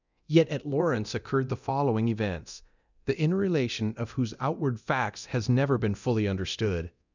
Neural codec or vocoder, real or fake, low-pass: codec, 24 kHz, 0.9 kbps, DualCodec; fake; 7.2 kHz